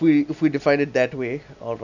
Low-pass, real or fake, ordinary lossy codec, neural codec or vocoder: 7.2 kHz; real; none; none